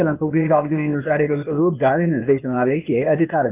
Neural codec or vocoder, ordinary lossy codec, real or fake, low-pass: codec, 16 kHz, 0.8 kbps, ZipCodec; none; fake; 3.6 kHz